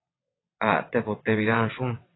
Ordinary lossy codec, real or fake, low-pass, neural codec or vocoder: AAC, 16 kbps; fake; 7.2 kHz; vocoder, 22.05 kHz, 80 mel bands, WaveNeXt